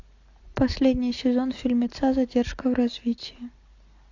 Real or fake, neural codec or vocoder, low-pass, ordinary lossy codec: real; none; 7.2 kHz; AAC, 48 kbps